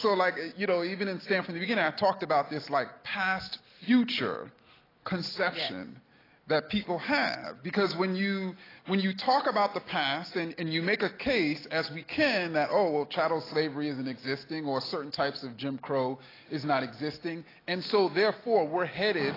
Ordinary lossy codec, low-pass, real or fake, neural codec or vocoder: AAC, 24 kbps; 5.4 kHz; real; none